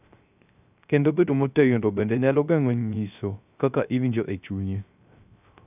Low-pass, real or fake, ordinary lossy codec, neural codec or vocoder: 3.6 kHz; fake; none; codec, 16 kHz, 0.3 kbps, FocalCodec